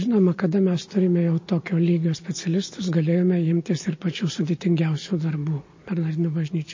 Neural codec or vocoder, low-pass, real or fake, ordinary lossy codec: none; 7.2 kHz; real; MP3, 32 kbps